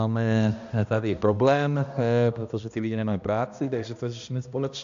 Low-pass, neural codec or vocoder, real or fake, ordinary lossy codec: 7.2 kHz; codec, 16 kHz, 1 kbps, X-Codec, HuBERT features, trained on balanced general audio; fake; AAC, 64 kbps